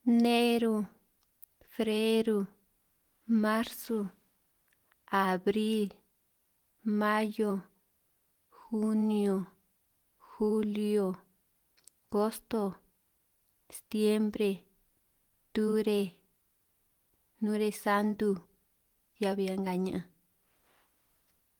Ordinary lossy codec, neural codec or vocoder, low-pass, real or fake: Opus, 24 kbps; vocoder, 44.1 kHz, 128 mel bands every 512 samples, BigVGAN v2; 19.8 kHz; fake